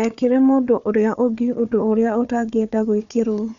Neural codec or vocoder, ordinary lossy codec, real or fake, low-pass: codec, 16 kHz, 4 kbps, FunCodec, trained on Chinese and English, 50 frames a second; none; fake; 7.2 kHz